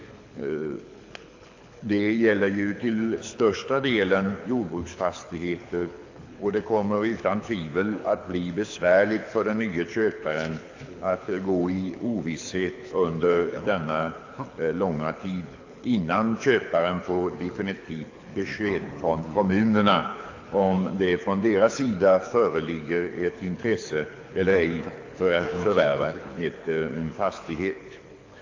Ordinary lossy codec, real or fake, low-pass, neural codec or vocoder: AAC, 48 kbps; fake; 7.2 kHz; codec, 24 kHz, 6 kbps, HILCodec